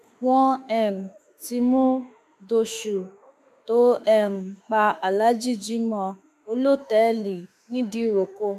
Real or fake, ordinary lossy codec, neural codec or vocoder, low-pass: fake; none; autoencoder, 48 kHz, 32 numbers a frame, DAC-VAE, trained on Japanese speech; 14.4 kHz